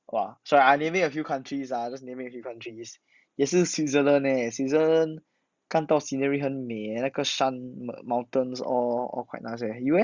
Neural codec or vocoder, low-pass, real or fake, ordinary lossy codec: none; 7.2 kHz; real; Opus, 64 kbps